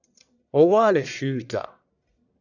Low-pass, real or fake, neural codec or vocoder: 7.2 kHz; fake; codec, 44.1 kHz, 1.7 kbps, Pupu-Codec